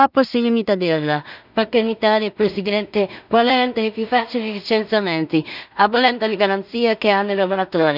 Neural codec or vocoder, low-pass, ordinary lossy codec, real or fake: codec, 16 kHz in and 24 kHz out, 0.4 kbps, LongCat-Audio-Codec, two codebook decoder; 5.4 kHz; none; fake